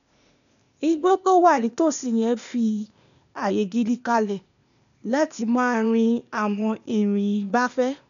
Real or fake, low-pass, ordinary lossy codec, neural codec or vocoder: fake; 7.2 kHz; none; codec, 16 kHz, 0.8 kbps, ZipCodec